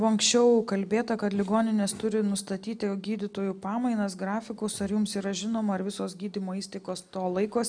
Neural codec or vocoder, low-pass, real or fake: none; 9.9 kHz; real